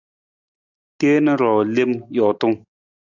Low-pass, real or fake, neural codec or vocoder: 7.2 kHz; real; none